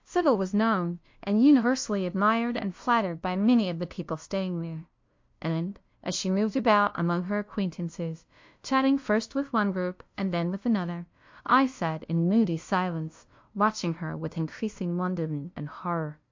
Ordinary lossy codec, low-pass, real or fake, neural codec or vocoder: MP3, 48 kbps; 7.2 kHz; fake; codec, 16 kHz, 0.5 kbps, FunCodec, trained on LibriTTS, 25 frames a second